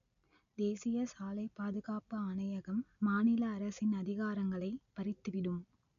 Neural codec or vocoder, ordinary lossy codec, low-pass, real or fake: none; none; 7.2 kHz; real